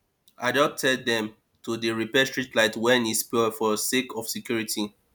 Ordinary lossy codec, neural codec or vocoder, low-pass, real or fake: none; none; none; real